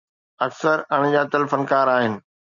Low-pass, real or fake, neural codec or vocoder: 7.2 kHz; real; none